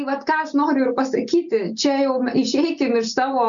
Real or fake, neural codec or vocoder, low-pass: real; none; 7.2 kHz